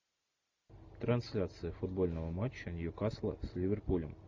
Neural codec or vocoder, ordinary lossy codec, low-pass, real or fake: none; MP3, 64 kbps; 7.2 kHz; real